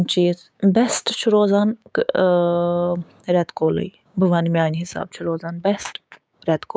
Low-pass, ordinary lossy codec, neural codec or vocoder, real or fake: none; none; codec, 16 kHz, 6 kbps, DAC; fake